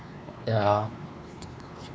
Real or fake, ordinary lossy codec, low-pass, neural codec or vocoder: fake; none; none; codec, 16 kHz, 2 kbps, X-Codec, WavLM features, trained on Multilingual LibriSpeech